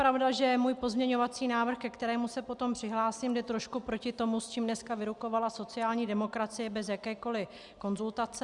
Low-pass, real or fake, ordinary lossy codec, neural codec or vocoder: 10.8 kHz; real; Opus, 64 kbps; none